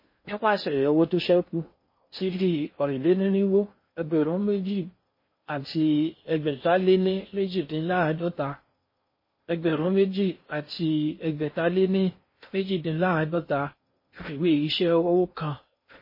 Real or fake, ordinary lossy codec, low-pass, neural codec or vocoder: fake; MP3, 24 kbps; 5.4 kHz; codec, 16 kHz in and 24 kHz out, 0.6 kbps, FocalCodec, streaming, 2048 codes